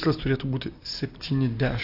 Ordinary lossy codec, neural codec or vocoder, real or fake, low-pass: AAC, 32 kbps; none; real; 5.4 kHz